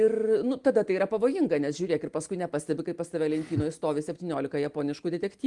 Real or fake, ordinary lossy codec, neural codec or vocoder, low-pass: real; Opus, 24 kbps; none; 10.8 kHz